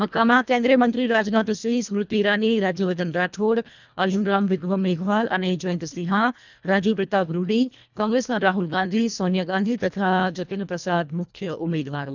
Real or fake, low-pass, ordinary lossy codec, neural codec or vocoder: fake; 7.2 kHz; none; codec, 24 kHz, 1.5 kbps, HILCodec